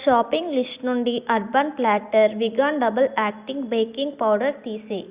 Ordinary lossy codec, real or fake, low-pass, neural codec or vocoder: Opus, 64 kbps; real; 3.6 kHz; none